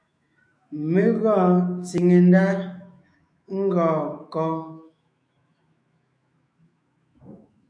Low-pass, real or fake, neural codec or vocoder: 9.9 kHz; fake; autoencoder, 48 kHz, 128 numbers a frame, DAC-VAE, trained on Japanese speech